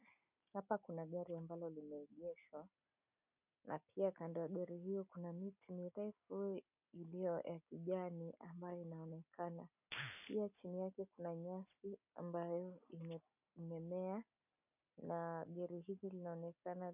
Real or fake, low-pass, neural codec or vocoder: real; 3.6 kHz; none